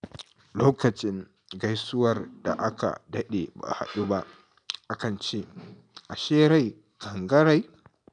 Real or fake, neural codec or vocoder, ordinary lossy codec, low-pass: real; none; none; 9.9 kHz